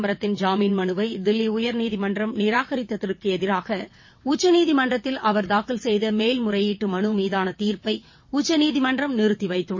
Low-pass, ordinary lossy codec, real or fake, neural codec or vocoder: 7.2 kHz; MP3, 32 kbps; fake; vocoder, 22.05 kHz, 80 mel bands, WaveNeXt